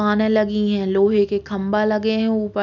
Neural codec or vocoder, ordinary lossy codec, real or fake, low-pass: none; none; real; 7.2 kHz